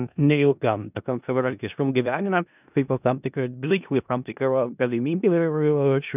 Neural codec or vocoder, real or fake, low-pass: codec, 16 kHz in and 24 kHz out, 0.4 kbps, LongCat-Audio-Codec, four codebook decoder; fake; 3.6 kHz